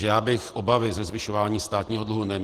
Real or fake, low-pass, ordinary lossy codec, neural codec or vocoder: fake; 14.4 kHz; Opus, 16 kbps; vocoder, 48 kHz, 128 mel bands, Vocos